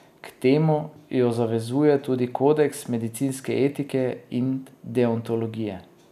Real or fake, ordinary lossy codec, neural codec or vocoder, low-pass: real; none; none; 14.4 kHz